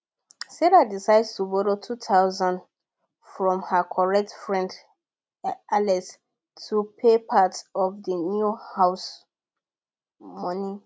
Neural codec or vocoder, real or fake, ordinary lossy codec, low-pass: none; real; none; none